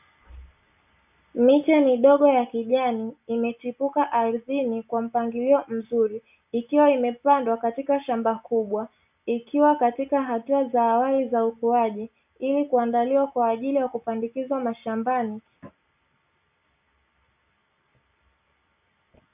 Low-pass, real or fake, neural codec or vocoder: 3.6 kHz; real; none